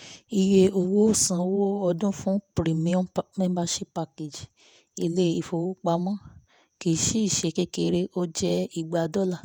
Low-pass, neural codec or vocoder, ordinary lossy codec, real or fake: none; vocoder, 48 kHz, 128 mel bands, Vocos; none; fake